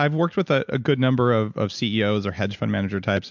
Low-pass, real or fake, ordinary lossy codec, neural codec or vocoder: 7.2 kHz; real; MP3, 64 kbps; none